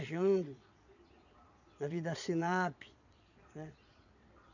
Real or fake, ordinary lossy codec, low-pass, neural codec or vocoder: fake; none; 7.2 kHz; vocoder, 22.05 kHz, 80 mel bands, Vocos